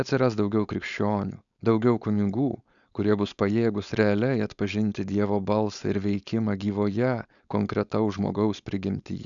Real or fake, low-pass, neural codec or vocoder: fake; 7.2 kHz; codec, 16 kHz, 4.8 kbps, FACodec